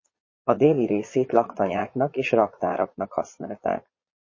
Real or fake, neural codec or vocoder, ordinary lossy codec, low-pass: fake; vocoder, 22.05 kHz, 80 mel bands, Vocos; MP3, 32 kbps; 7.2 kHz